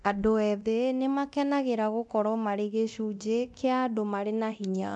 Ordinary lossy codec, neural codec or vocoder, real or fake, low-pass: none; codec, 24 kHz, 0.9 kbps, DualCodec; fake; none